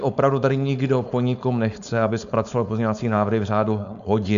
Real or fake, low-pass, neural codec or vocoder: fake; 7.2 kHz; codec, 16 kHz, 4.8 kbps, FACodec